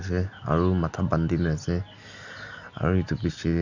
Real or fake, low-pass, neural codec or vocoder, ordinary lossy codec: real; 7.2 kHz; none; none